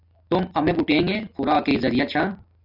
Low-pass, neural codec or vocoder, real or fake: 5.4 kHz; none; real